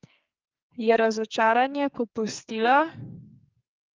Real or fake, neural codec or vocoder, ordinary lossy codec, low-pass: fake; codec, 44.1 kHz, 2.6 kbps, SNAC; Opus, 24 kbps; 7.2 kHz